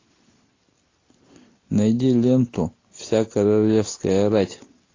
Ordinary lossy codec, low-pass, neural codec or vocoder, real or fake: AAC, 32 kbps; 7.2 kHz; none; real